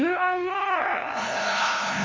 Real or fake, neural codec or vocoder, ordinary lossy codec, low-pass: fake; codec, 16 kHz, 0.5 kbps, FunCodec, trained on LibriTTS, 25 frames a second; MP3, 32 kbps; 7.2 kHz